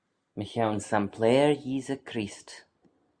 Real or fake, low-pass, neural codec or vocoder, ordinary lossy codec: real; 9.9 kHz; none; Opus, 64 kbps